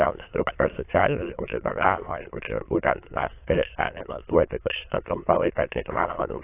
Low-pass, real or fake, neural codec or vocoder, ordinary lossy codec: 3.6 kHz; fake; autoencoder, 22.05 kHz, a latent of 192 numbers a frame, VITS, trained on many speakers; AAC, 24 kbps